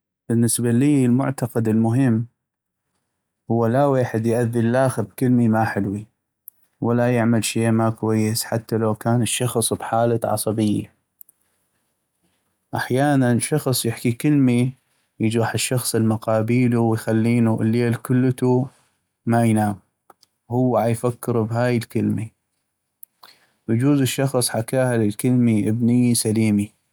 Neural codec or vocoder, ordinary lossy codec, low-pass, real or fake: none; none; none; real